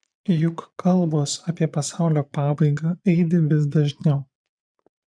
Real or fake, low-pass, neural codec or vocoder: fake; 9.9 kHz; vocoder, 22.05 kHz, 80 mel bands, Vocos